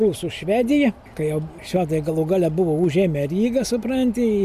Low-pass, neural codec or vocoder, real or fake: 14.4 kHz; none; real